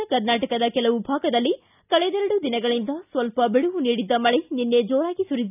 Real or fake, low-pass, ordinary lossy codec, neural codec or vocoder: real; 3.6 kHz; none; none